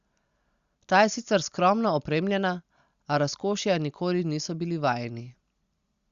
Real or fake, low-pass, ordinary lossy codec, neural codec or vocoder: real; 7.2 kHz; Opus, 64 kbps; none